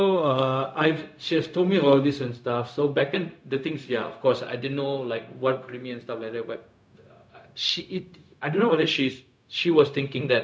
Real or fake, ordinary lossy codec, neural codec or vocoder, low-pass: fake; none; codec, 16 kHz, 0.4 kbps, LongCat-Audio-Codec; none